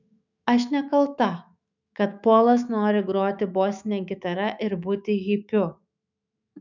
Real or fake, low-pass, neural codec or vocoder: fake; 7.2 kHz; autoencoder, 48 kHz, 128 numbers a frame, DAC-VAE, trained on Japanese speech